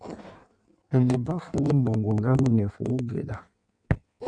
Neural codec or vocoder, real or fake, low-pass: codec, 16 kHz in and 24 kHz out, 1.1 kbps, FireRedTTS-2 codec; fake; 9.9 kHz